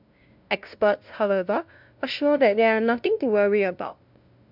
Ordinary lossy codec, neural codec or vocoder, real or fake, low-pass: MP3, 48 kbps; codec, 16 kHz, 0.5 kbps, FunCodec, trained on LibriTTS, 25 frames a second; fake; 5.4 kHz